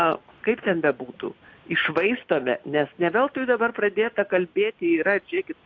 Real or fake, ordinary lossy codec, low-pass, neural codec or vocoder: real; AAC, 48 kbps; 7.2 kHz; none